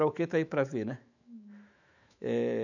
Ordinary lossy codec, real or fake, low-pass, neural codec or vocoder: none; fake; 7.2 kHz; autoencoder, 48 kHz, 128 numbers a frame, DAC-VAE, trained on Japanese speech